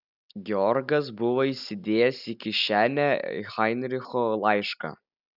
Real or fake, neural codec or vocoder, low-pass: real; none; 5.4 kHz